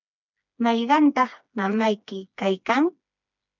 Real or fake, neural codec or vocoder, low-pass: fake; codec, 16 kHz, 2 kbps, FreqCodec, smaller model; 7.2 kHz